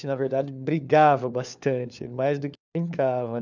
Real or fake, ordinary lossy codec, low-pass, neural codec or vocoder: fake; none; 7.2 kHz; codec, 16 kHz, 4 kbps, FunCodec, trained on LibriTTS, 50 frames a second